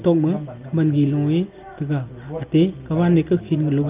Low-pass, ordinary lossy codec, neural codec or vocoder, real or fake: 3.6 kHz; Opus, 32 kbps; none; real